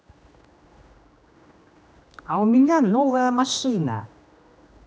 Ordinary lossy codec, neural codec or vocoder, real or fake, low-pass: none; codec, 16 kHz, 1 kbps, X-Codec, HuBERT features, trained on general audio; fake; none